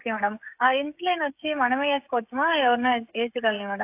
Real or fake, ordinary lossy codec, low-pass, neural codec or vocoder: fake; AAC, 32 kbps; 3.6 kHz; codec, 16 kHz, 16 kbps, FreqCodec, smaller model